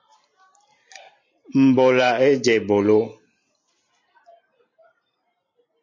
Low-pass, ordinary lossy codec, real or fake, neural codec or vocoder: 7.2 kHz; MP3, 32 kbps; fake; vocoder, 44.1 kHz, 128 mel bands every 512 samples, BigVGAN v2